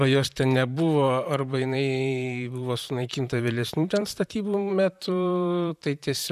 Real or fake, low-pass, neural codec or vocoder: fake; 14.4 kHz; vocoder, 44.1 kHz, 128 mel bands, Pupu-Vocoder